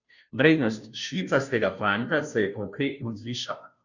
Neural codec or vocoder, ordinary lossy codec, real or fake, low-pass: codec, 16 kHz, 0.5 kbps, FunCodec, trained on Chinese and English, 25 frames a second; none; fake; 7.2 kHz